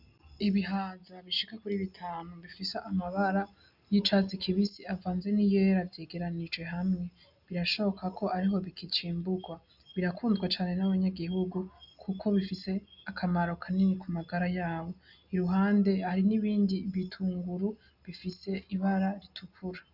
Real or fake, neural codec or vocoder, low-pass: real; none; 5.4 kHz